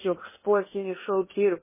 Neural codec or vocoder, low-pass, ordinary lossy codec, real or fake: codec, 16 kHz in and 24 kHz out, 0.8 kbps, FocalCodec, streaming, 65536 codes; 3.6 kHz; MP3, 16 kbps; fake